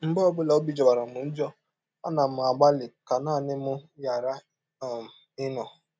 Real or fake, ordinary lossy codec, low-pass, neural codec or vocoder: real; none; none; none